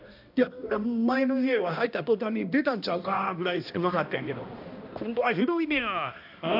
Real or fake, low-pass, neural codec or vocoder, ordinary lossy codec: fake; 5.4 kHz; codec, 16 kHz, 1 kbps, X-Codec, HuBERT features, trained on balanced general audio; none